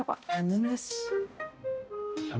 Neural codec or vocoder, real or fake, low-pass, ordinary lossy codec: codec, 16 kHz, 0.5 kbps, X-Codec, HuBERT features, trained on balanced general audio; fake; none; none